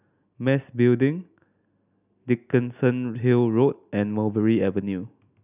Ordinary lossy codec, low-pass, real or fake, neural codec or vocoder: none; 3.6 kHz; real; none